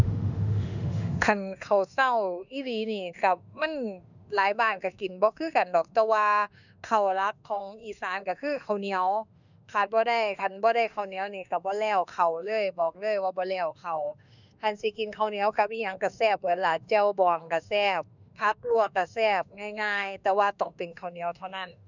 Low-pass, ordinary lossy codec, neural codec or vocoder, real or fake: 7.2 kHz; none; autoencoder, 48 kHz, 32 numbers a frame, DAC-VAE, trained on Japanese speech; fake